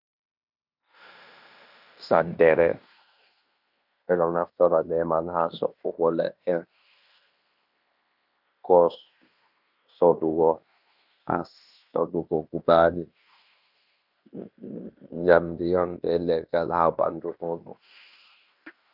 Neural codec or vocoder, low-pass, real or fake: codec, 16 kHz in and 24 kHz out, 0.9 kbps, LongCat-Audio-Codec, fine tuned four codebook decoder; 5.4 kHz; fake